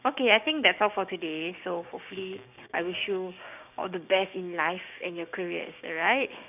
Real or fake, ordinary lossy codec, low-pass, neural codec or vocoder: fake; none; 3.6 kHz; codec, 16 kHz, 6 kbps, DAC